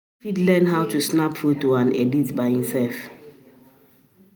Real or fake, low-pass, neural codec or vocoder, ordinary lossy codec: real; none; none; none